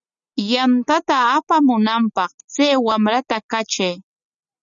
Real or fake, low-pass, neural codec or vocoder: real; 7.2 kHz; none